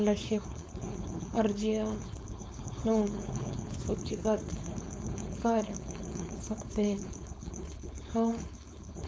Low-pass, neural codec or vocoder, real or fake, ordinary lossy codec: none; codec, 16 kHz, 4.8 kbps, FACodec; fake; none